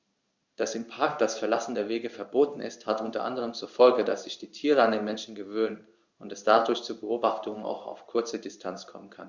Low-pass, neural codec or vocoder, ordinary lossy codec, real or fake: 7.2 kHz; codec, 16 kHz in and 24 kHz out, 1 kbps, XY-Tokenizer; Opus, 64 kbps; fake